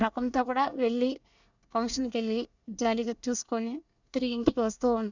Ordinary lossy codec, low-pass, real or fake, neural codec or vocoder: none; 7.2 kHz; fake; codec, 24 kHz, 1 kbps, SNAC